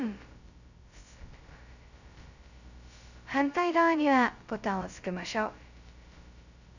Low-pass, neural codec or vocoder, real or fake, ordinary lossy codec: 7.2 kHz; codec, 16 kHz, 0.2 kbps, FocalCodec; fake; none